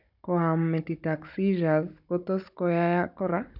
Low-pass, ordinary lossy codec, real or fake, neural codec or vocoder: 5.4 kHz; none; fake; codec, 16 kHz, 16 kbps, FunCodec, trained on Chinese and English, 50 frames a second